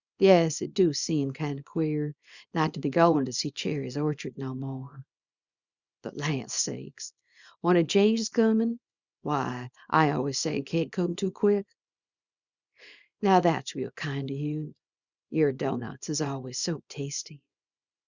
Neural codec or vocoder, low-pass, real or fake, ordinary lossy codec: codec, 24 kHz, 0.9 kbps, WavTokenizer, small release; 7.2 kHz; fake; Opus, 64 kbps